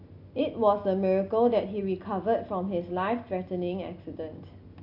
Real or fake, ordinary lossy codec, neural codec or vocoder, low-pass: real; AAC, 32 kbps; none; 5.4 kHz